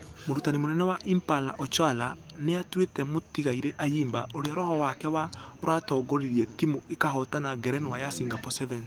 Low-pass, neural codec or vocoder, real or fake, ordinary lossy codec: 19.8 kHz; codec, 44.1 kHz, 7.8 kbps, DAC; fake; Opus, 32 kbps